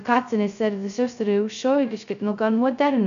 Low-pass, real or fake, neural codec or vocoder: 7.2 kHz; fake; codec, 16 kHz, 0.2 kbps, FocalCodec